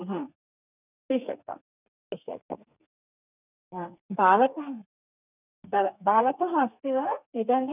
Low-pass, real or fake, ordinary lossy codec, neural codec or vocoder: 3.6 kHz; fake; none; codec, 32 kHz, 1.9 kbps, SNAC